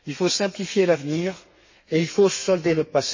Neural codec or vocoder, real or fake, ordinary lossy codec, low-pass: codec, 32 kHz, 1.9 kbps, SNAC; fake; MP3, 32 kbps; 7.2 kHz